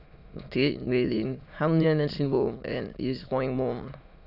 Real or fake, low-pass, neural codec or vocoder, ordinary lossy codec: fake; 5.4 kHz; autoencoder, 22.05 kHz, a latent of 192 numbers a frame, VITS, trained on many speakers; none